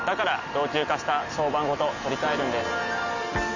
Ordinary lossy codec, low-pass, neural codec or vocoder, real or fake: none; 7.2 kHz; none; real